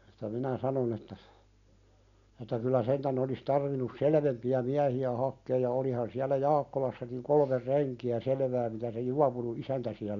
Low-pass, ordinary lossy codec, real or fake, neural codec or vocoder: 7.2 kHz; none; real; none